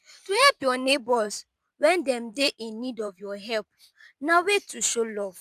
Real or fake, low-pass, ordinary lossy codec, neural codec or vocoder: fake; 14.4 kHz; none; vocoder, 44.1 kHz, 128 mel bands every 512 samples, BigVGAN v2